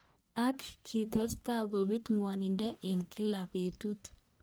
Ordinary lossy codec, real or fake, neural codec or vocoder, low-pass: none; fake; codec, 44.1 kHz, 1.7 kbps, Pupu-Codec; none